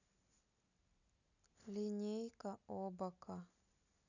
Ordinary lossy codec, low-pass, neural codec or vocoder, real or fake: none; 7.2 kHz; none; real